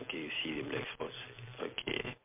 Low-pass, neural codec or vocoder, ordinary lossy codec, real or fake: 3.6 kHz; none; MP3, 32 kbps; real